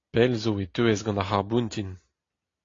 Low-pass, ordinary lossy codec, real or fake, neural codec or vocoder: 7.2 kHz; AAC, 32 kbps; real; none